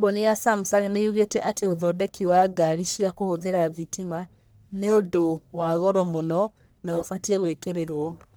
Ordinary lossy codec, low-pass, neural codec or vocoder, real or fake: none; none; codec, 44.1 kHz, 1.7 kbps, Pupu-Codec; fake